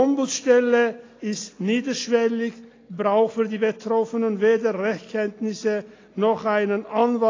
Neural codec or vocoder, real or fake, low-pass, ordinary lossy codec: none; real; 7.2 kHz; AAC, 32 kbps